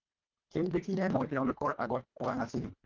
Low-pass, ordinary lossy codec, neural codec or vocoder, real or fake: 7.2 kHz; Opus, 24 kbps; codec, 24 kHz, 1.5 kbps, HILCodec; fake